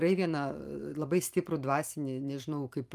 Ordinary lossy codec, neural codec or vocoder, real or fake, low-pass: Opus, 32 kbps; vocoder, 44.1 kHz, 128 mel bands every 512 samples, BigVGAN v2; fake; 14.4 kHz